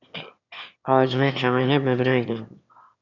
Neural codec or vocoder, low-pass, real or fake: autoencoder, 22.05 kHz, a latent of 192 numbers a frame, VITS, trained on one speaker; 7.2 kHz; fake